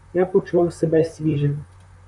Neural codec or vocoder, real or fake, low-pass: vocoder, 44.1 kHz, 128 mel bands, Pupu-Vocoder; fake; 10.8 kHz